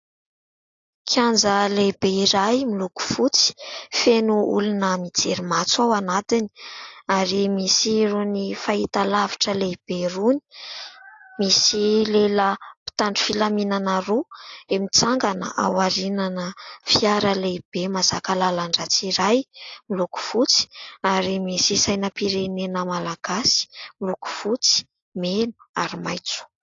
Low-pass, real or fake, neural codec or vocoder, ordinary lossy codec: 7.2 kHz; real; none; AAC, 48 kbps